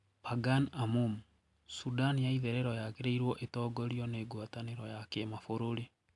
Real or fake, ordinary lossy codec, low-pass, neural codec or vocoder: real; MP3, 96 kbps; 10.8 kHz; none